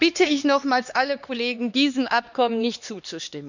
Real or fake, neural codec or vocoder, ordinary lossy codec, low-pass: fake; codec, 16 kHz, 2 kbps, X-Codec, HuBERT features, trained on LibriSpeech; none; 7.2 kHz